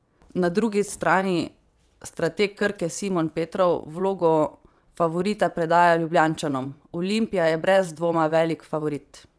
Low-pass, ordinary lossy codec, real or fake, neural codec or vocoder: none; none; fake; vocoder, 22.05 kHz, 80 mel bands, WaveNeXt